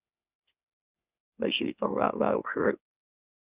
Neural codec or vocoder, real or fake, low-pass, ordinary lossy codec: autoencoder, 44.1 kHz, a latent of 192 numbers a frame, MeloTTS; fake; 3.6 kHz; Opus, 64 kbps